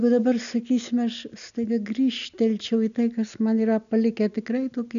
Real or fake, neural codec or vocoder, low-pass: real; none; 7.2 kHz